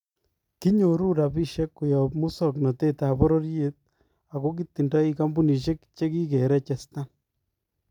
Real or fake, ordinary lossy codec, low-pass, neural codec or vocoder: real; none; 19.8 kHz; none